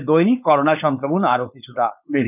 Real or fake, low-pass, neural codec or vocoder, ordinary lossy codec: fake; 3.6 kHz; codec, 16 kHz, 4.8 kbps, FACodec; none